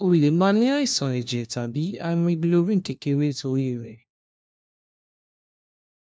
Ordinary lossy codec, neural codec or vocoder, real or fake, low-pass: none; codec, 16 kHz, 1 kbps, FunCodec, trained on LibriTTS, 50 frames a second; fake; none